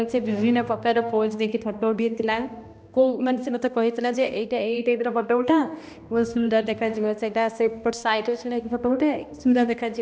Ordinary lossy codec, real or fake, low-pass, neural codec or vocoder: none; fake; none; codec, 16 kHz, 1 kbps, X-Codec, HuBERT features, trained on balanced general audio